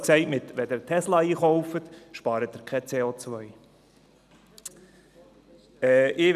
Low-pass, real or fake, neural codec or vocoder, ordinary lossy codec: 14.4 kHz; real; none; none